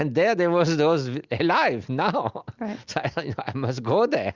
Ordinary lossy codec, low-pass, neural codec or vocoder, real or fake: Opus, 64 kbps; 7.2 kHz; none; real